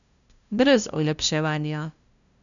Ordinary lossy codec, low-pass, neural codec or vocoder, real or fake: none; 7.2 kHz; codec, 16 kHz, 0.5 kbps, FunCodec, trained on LibriTTS, 25 frames a second; fake